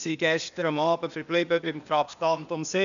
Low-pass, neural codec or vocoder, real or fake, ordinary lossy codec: 7.2 kHz; codec, 16 kHz, 0.8 kbps, ZipCodec; fake; none